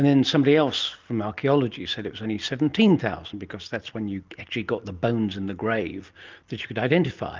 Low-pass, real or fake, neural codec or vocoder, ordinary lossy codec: 7.2 kHz; real; none; Opus, 24 kbps